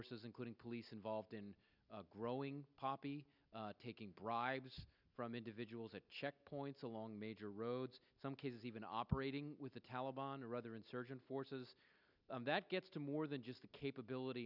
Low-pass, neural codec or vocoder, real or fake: 5.4 kHz; none; real